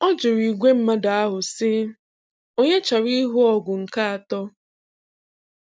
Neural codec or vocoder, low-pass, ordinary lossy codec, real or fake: none; none; none; real